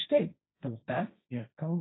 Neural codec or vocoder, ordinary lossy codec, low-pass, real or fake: codec, 16 kHz, 1.1 kbps, Voila-Tokenizer; AAC, 16 kbps; 7.2 kHz; fake